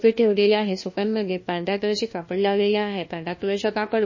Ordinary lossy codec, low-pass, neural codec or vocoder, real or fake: MP3, 32 kbps; 7.2 kHz; codec, 16 kHz, 1 kbps, FunCodec, trained on LibriTTS, 50 frames a second; fake